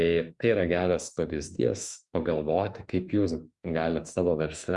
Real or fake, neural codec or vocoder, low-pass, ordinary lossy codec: fake; autoencoder, 48 kHz, 32 numbers a frame, DAC-VAE, trained on Japanese speech; 10.8 kHz; Opus, 64 kbps